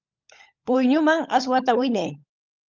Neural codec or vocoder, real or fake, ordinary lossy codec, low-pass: codec, 16 kHz, 16 kbps, FunCodec, trained on LibriTTS, 50 frames a second; fake; Opus, 24 kbps; 7.2 kHz